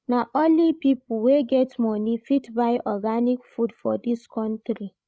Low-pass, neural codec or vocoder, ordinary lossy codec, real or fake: none; codec, 16 kHz, 16 kbps, FreqCodec, larger model; none; fake